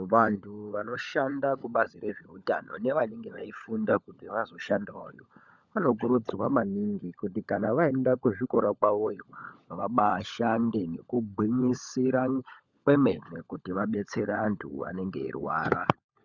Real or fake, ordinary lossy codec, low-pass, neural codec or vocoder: fake; Opus, 64 kbps; 7.2 kHz; codec, 16 kHz, 16 kbps, FunCodec, trained on LibriTTS, 50 frames a second